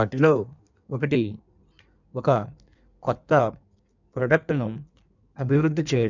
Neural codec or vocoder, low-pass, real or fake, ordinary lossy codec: codec, 16 kHz in and 24 kHz out, 1.1 kbps, FireRedTTS-2 codec; 7.2 kHz; fake; none